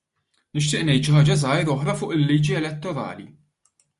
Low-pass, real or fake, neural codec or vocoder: 10.8 kHz; real; none